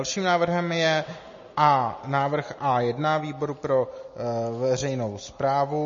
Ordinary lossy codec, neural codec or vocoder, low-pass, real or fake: MP3, 32 kbps; none; 7.2 kHz; real